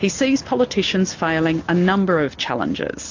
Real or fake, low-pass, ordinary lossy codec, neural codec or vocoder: real; 7.2 kHz; AAC, 48 kbps; none